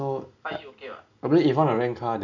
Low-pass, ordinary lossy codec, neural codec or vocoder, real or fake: 7.2 kHz; none; none; real